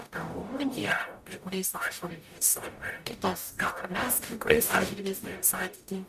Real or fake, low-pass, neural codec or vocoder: fake; 14.4 kHz; codec, 44.1 kHz, 0.9 kbps, DAC